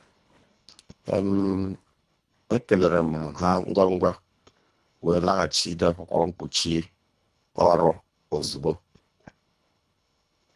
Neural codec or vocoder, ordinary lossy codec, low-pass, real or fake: codec, 24 kHz, 1.5 kbps, HILCodec; none; none; fake